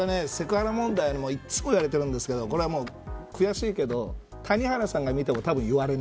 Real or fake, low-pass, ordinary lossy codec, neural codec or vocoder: real; none; none; none